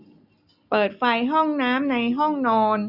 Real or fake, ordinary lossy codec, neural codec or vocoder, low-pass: real; none; none; 5.4 kHz